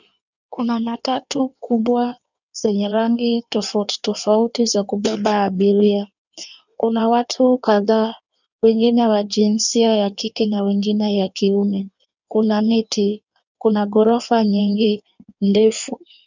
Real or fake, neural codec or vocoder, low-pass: fake; codec, 16 kHz in and 24 kHz out, 1.1 kbps, FireRedTTS-2 codec; 7.2 kHz